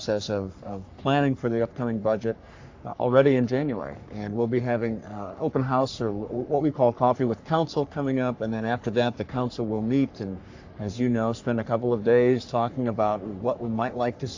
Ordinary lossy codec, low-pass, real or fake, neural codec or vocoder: AAC, 48 kbps; 7.2 kHz; fake; codec, 44.1 kHz, 3.4 kbps, Pupu-Codec